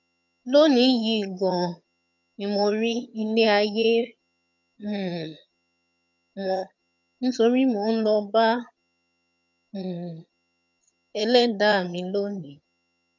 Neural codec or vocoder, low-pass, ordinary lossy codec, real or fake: vocoder, 22.05 kHz, 80 mel bands, HiFi-GAN; 7.2 kHz; none; fake